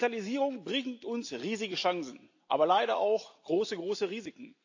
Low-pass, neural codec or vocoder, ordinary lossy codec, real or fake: 7.2 kHz; none; AAC, 48 kbps; real